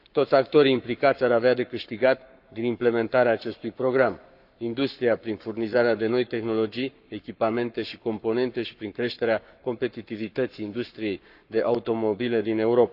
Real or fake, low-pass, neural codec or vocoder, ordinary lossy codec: fake; 5.4 kHz; codec, 44.1 kHz, 7.8 kbps, Pupu-Codec; none